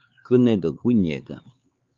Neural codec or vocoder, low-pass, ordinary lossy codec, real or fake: codec, 16 kHz, 4 kbps, X-Codec, HuBERT features, trained on LibriSpeech; 7.2 kHz; Opus, 32 kbps; fake